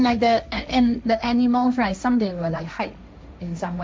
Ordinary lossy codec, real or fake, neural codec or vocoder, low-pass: none; fake; codec, 16 kHz, 1.1 kbps, Voila-Tokenizer; none